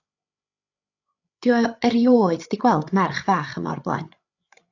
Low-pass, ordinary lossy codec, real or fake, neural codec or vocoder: 7.2 kHz; AAC, 48 kbps; fake; codec, 16 kHz, 8 kbps, FreqCodec, larger model